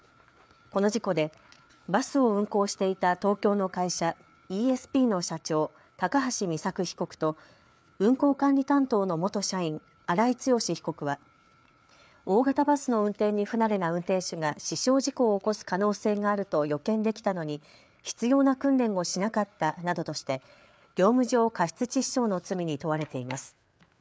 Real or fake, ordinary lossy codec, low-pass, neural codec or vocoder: fake; none; none; codec, 16 kHz, 4 kbps, FreqCodec, larger model